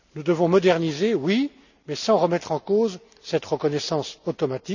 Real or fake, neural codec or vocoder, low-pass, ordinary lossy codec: real; none; 7.2 kHz; none